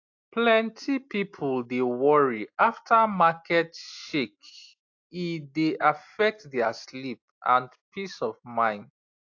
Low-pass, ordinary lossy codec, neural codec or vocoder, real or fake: 7.2 kHz; AAC, 48 kbps; none; real